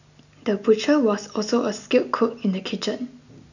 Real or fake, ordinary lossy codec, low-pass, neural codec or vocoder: real; none; 7.2 kHz; none